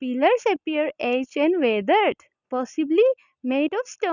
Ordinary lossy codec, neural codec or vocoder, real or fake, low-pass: none; none; real; 7.2 kHz